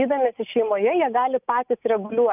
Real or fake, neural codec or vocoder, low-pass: real; none; 3.6 kHz